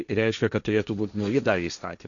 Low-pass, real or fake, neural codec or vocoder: 7.2 kHz; fake; codec, 16 kHz, 1.1 kbps, Voila-Tokenizer